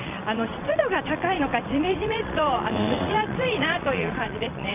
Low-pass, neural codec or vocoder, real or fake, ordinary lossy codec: 3.6 kHz; vocoder, 22.05 kHz, 80 mel bands, WaveNeXt; fake; none